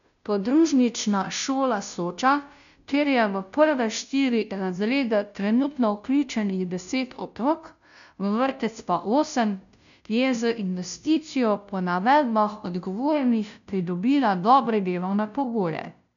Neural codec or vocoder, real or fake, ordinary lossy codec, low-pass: codec, 16 kHz, 0.5 kbps, FunCodec, trained on Chinese and English, 25 frames a second; fake; none; 7.2 kHz